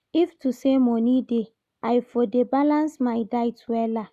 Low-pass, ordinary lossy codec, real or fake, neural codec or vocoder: 14.4 kHz; none; real; none